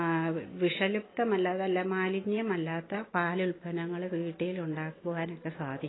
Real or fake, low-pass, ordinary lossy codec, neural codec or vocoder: real; 7.2 kHz; AAC, 16 kbps; none